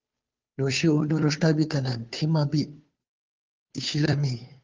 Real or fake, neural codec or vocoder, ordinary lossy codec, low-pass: fake; codec, 16 kHz, 2 kbps, FunCodec, trained on Chinese and English, 25 frames a second; Opus, 24 kbps; 7.2 kHz